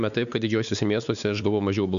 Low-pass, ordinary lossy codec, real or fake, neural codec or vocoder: 7.2 kHz; MP3, 96 kbps; fake; codec, 16 kHz, 4 kbps, X-Codec, WavLM features, trained on Multilingual LibriSpeech